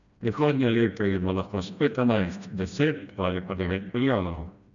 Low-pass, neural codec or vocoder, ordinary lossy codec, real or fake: 7.2 kHz; codec, 16 kHz, 1 kbps, FreqCodec, smaller model; none; fake